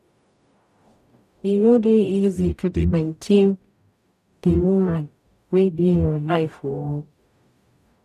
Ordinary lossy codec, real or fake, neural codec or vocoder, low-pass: none; fake; codec, 44.1 kHz, 0.9 kbps, DAC; 14.4 kHz